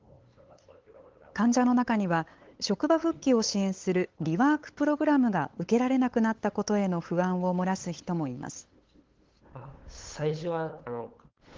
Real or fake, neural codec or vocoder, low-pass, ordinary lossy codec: fake; codec, 16 kHz, 8 kbps, FunCodec, trained on LibriTTS, 25 frames a second; 7.2 kHz; Opus, 16 kbps